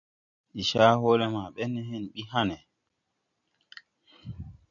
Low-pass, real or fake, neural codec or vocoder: 7.2 kHz; real; none